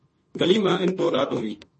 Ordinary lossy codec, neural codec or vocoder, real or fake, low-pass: MP3, 32 kbps; autoencoder, 48 kHz, 32 numbers a frame, DAC-VAE, trained on Japanese speech; fake; 10.8 kHz